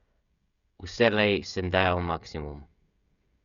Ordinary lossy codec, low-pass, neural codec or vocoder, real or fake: Opus, 64 kbps; 7.2 kHz; codec, 16 kHz, 16 kbps, FreqCodec, smaller model; fake